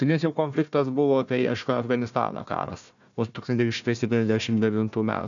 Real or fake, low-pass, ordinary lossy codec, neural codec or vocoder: fake; 7.2 kHz; MP3, 96 kbps; codec, 16 kHz, 1 kbps, FunCodec, trained on Chinese and English, 50 frames a second